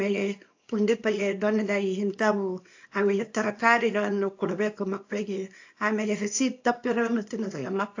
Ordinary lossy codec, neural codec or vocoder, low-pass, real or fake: AAC, 48 kbps; codec, 24 kHz, 0.9 kbps, WavTokenizer, small release; 7.2 kHz; fake